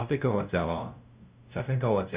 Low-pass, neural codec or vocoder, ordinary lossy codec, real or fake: 3.6 kHz; codec, 16 kHz, 0.5 kbps, FunCodec, trained on LibriTTS, 25 frames a second; Opus, 64 kbps; fake